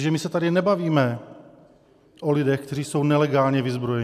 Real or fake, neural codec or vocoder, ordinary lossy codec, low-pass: real; none; MP3, 96 kbps; 14.4 kHz